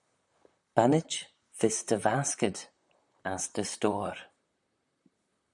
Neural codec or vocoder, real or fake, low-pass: vocoder, 44.1 kHz, 128 mel bands, Pupu-Vocoder; fake; 10.8 kHz